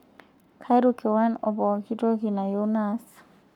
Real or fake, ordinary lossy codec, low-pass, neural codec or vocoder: fake; none; 19.8 kHz; codec, 44.1 kHz, 7.8 kbps, Pupu-Codec